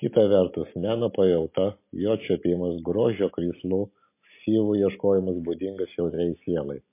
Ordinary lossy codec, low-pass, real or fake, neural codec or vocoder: MP3, 24 kbps; 3.6 kHz; real; none